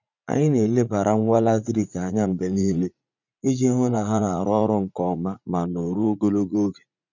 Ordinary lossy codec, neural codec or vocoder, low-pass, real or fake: none; vocoder, 44.1 kHz, 80 mel bands, Vocos; 7.2 kHz; fake